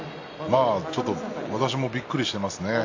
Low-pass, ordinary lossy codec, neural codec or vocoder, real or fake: 7.2 kHz; AAC, 48 kbps; none; real